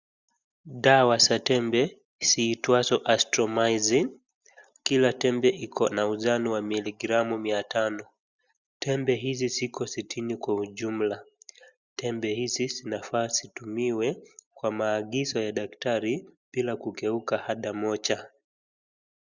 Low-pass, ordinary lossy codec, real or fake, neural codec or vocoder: 7.2 kHz; Opus, 64 kbps; real; none